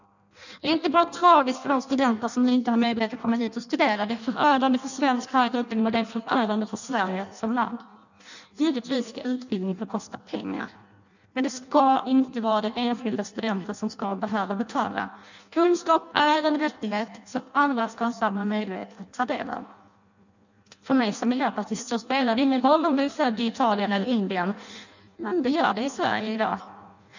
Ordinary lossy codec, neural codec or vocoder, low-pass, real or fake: none; codec, 16 kHz in and 24 kHz out, 0.6 kbps, FireRedTTS-2 codec; 7.2 kHz; fake